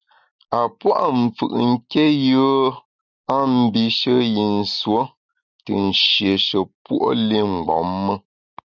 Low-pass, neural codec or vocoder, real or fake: 7.2 kHz; none; real